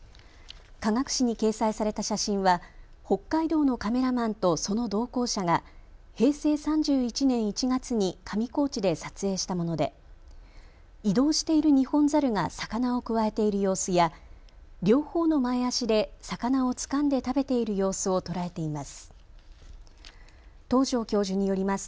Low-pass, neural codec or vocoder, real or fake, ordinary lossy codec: none; none; real; none